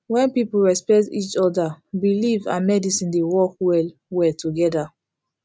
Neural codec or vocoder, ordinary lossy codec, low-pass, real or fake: none; none; none; real